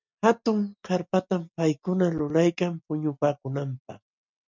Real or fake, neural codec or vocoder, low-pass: real; none; 7.2 kHz